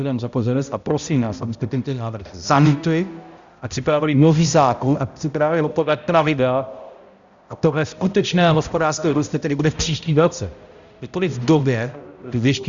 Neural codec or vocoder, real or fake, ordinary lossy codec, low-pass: codec, 16 kHz, 0.5 kbps, X-Codec, HuBERT features, trained on balanced general audio; fake; Opus, 64 kbps; 7.2 kHz